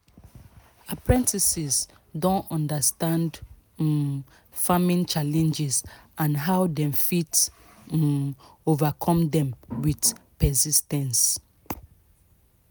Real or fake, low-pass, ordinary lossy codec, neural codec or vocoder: real; none; none; none